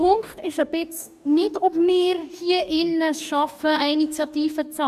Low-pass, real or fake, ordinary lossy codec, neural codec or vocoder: 14.4 kHz; fake; none; codec, 44.1 kHz, 2.6 kbps, DAC